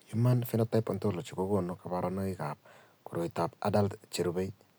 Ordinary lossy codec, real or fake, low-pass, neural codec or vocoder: none; real; none; none